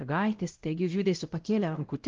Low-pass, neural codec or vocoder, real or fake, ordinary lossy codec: 7.2 kHz; codec, 16 kHz, 0.5 kbps, X-Codec, WavLM features, trained on Multilingual LibriSpeech; fake; Opus, 16 kbps